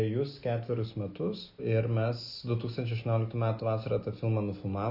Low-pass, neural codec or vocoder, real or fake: 5.4 kHz; none; real